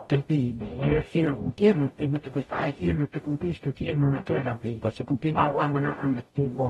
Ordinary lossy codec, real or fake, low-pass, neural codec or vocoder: AAC, 32 kbps; fake; 19.8 kHz; codec, 44.1 kHz, 0.9 kbps, DAC